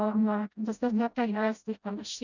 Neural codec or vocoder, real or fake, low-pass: codec, 16 kHz, 0.5 kbps, FreqCodec, smaller model; fake; 7.2 kHz